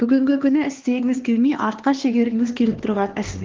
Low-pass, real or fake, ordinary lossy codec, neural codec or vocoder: 7.2 kHz; fake; Opus, 16 kbps; codec, 16 kHz, 2 kbps, X-Codec, HuBERT features, trained on LibriSpeech